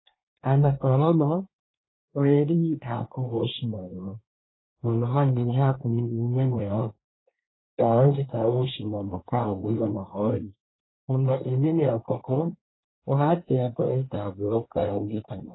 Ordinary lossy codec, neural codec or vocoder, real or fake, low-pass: AAC, 16 kbps; codec, 24 kHz, 1 kbps, SNAC; fake; 7.2 kHz